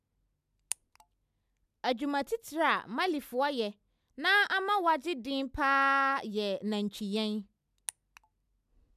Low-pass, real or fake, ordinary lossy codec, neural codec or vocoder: 14.4 kHz; real; none; none